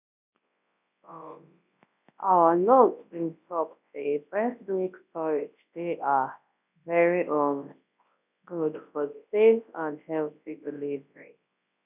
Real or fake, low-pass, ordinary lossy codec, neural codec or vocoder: fake; 3.6 kHz; none; codec, 24 kHz, 0.9 kbps, WavTokenizer, large speech release